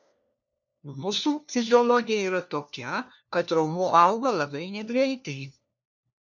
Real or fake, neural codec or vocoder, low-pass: fake; codec, 16 kHz, 1 kbps, FunCodec, trained on LibriTTS, 50 frames a second; 7.2 kHz